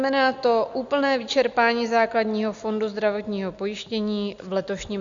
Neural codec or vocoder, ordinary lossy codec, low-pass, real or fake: none; Opus, 64 kbps; 7.2 kHz; real